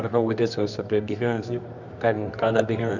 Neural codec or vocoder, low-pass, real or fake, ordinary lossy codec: codec, 24 kHz, 0.9 kbps, WavTokenizer, medium music audio release; 7.2 kHz; fake; none